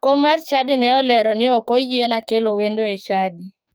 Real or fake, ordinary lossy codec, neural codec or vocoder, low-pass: fake; none; codec, 44.1 kHz, 2.6 kbps, SNAC; none